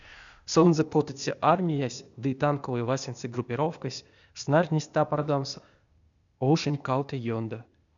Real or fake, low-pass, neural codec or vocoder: fake; 7.2 kHz; codec, 16 kHz, 0.8 kbps, ZipCodec